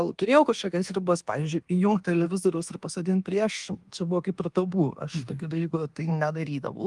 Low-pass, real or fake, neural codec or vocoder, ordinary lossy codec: 10.8 kHz; fake; codec, 24 kHz, 1.2 kbps, DualCodec; Opus, 24 kbps